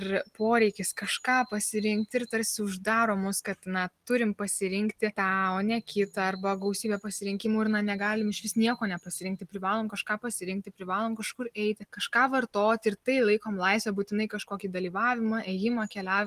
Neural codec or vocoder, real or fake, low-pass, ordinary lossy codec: none; real; 14.4 kHz; Opus, 24 kbps